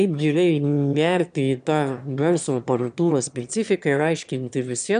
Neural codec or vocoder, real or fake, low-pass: autoencoder, 22.05 kHz, a latent of 192 numbers a frame, VITS, trained on one speaker; fake; 9.9 kHz